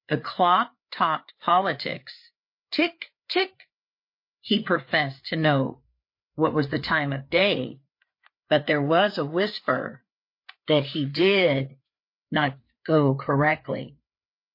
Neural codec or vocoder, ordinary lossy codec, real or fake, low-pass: codec, 16 kHz, 4 kbps, FreqCodec, larger model; MP3, 32 kbps; fake; 5.4 kHz